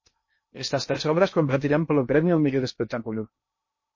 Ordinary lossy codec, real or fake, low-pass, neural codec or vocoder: MP3, 32 kbps; fake; 7.2 kHz; codec, 16 kHz in and 24 kHz out, 0.6 kbps, FocalCodec, streaming, 2048 codes